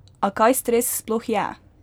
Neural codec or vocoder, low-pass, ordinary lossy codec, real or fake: none; none; none; real